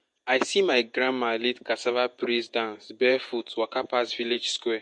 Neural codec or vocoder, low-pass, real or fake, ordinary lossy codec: vocoder, 48 kHz, 128 mel bands, Vocos; 10.8 kHz; fake; MP3, 48 kbps